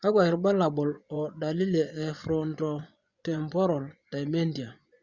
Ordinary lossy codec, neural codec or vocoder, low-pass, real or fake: Opus, 64 kbps; vocoder, 24 kHz, 100 mel bands, Vocos; 7.2 kHz; fake